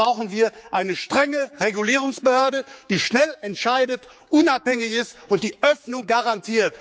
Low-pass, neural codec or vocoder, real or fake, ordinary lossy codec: none; codec, 16 kHz, 4 kbps, X-Codec, HuBERT features, trained on general audio; fake; none